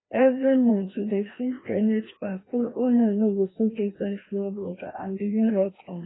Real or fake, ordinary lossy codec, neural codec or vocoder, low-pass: fake; AAC, 16 kbps; codec, 16 kHz, 1 kbps, FreqCodec, larger model; 7.2 kHz